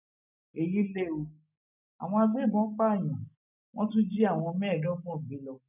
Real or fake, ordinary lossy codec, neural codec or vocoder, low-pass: real; none; none; 3.6 kHz